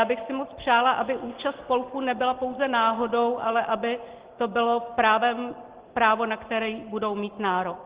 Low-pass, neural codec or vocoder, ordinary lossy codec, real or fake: 3.6 kHz; none; Opus, 16 kbps; real